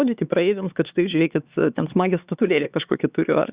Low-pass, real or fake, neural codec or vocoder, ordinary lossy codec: 3.6 kHz; fake; codec, 16 kHz, 4 kbps, X-Codec, HuBERT features, trained on LibriSpeech; Opus, 64 kbps